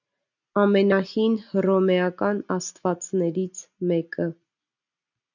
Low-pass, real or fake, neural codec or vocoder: 7.2 kHz; real; none